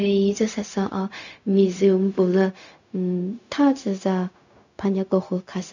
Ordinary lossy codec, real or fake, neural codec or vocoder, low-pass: none; fake; codec, 16 kHz, 0.4 kbps, LongCat-Audio-Codec; 7.2 kHz